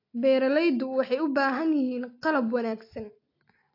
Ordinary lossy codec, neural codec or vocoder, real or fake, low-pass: AAC, 32 kbps; none; real; 5.4 kHz